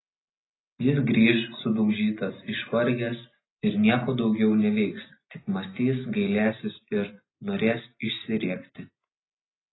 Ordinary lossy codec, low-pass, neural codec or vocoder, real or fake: AAC, 16 kbps; 7.2 kHz; none; real